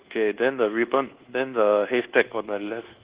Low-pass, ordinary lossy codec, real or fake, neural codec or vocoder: 3.6 kHz; Opus, 16 kbps; fake; codec, 24 kHz, 1.2 kbps, DualCodec